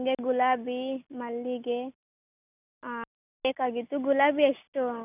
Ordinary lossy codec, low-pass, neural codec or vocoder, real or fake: none; 3.6 kHz; none; real